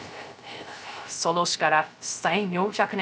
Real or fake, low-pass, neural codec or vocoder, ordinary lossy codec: fake; none; codec, 16 kHz, 0.3 kbps, FocalCodec; none